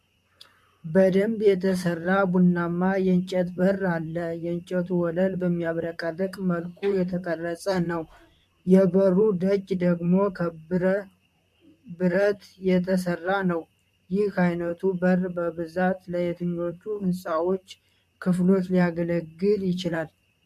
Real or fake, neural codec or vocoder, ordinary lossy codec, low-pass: fake; vocoder, 44.1 kHz, 128 mel bands, Pupu-Vocoder; MP3, 64 kbps; 14.4 kHz